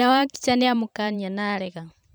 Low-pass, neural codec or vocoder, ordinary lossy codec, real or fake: none; vocoder, 44.1 kHz, 128 mel bands every 512 samples, BigVGAN v2; none; fake